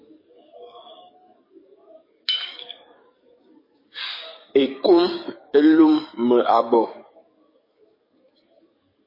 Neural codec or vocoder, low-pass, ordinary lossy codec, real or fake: codec, 44.1 kHz, 7.8 kbps, DAC; 5.4 kHz; MP3, 24 kbps; fake